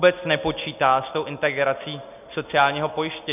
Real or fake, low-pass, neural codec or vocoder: real; 3.6 kHz; none